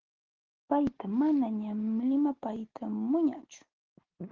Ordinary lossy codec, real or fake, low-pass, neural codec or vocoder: Opus, 16 kbps; real; 7.2 kHz; none